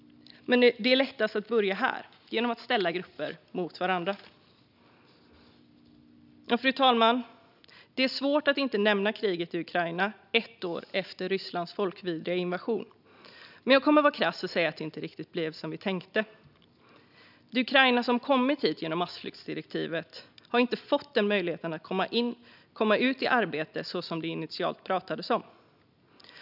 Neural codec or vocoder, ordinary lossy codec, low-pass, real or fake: none; none; 5.4 kHz; real